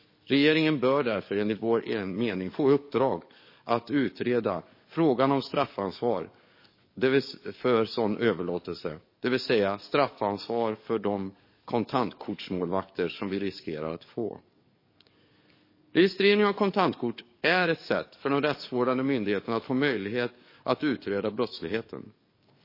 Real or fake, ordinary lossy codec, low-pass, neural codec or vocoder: fake; MP3, 24 kbps; 5.4 kHz; codec, 44.1 kHz, 7.8 kbps, DAC